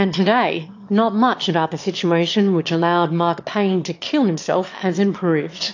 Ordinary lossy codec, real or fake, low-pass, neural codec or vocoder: AAC, 48 kbps; fake; 7.2 kHz; autoencoder, 22.05 kHz, a latent of 192 numbers a frame, VITS, trained on one speaker